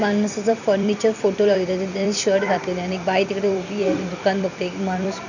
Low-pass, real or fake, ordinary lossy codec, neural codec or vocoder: 7.2 kHz; fake; none; vocoder, 44.1 kHz, 128 mel bands every 256 samples, BigVGAN v2